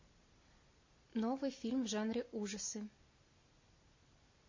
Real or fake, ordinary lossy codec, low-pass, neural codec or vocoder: real; MP3, 32 kbps; 7.2 kHz; none